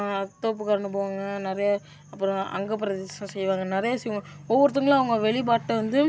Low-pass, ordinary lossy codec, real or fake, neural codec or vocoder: none; none; real; none